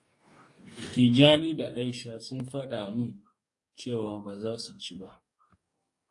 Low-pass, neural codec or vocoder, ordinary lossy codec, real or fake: 10.8 kHz; codec, 44.1 kHz, 2.6 kbps, DAC; AAC, 64 kbps; fake